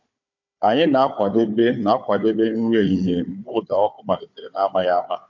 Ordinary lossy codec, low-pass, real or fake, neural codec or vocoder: MP3, 48 kbps; 7.2 kHz; fake; codec, 16 kHz, 4 kbps, FunCodec, trained on Chinese and English, 50 frames a second